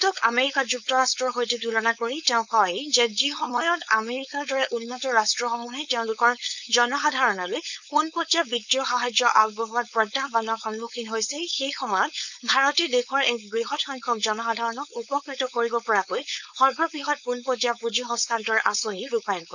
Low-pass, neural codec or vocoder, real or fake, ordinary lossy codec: 7.2 kHz; codec, 16 kHz, 4.8 kbps, FACodec; fake; none